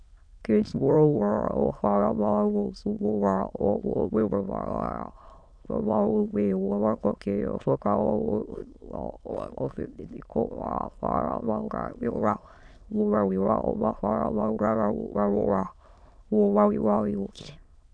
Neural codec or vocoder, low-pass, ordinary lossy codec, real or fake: autoencoder, 22.05 kHz, a latent of 192 numbers a frame, VITS, trained on many speakers; 9.9 kHz; MP3, 96 kbps; fake